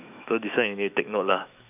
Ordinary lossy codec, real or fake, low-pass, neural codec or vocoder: none; fake; 3.6 kHz; autoencoder, 48 kHz, 128 numbers a frame, DAC-VAE, trained on Japanese speech